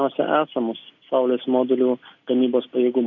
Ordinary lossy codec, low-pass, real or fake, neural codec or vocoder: MP3, 48 kbps; 7.2 kHz; real; none